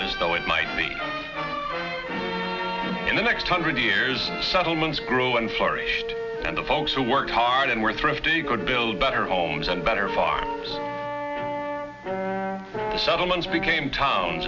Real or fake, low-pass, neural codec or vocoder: real; 7.2 kHz; none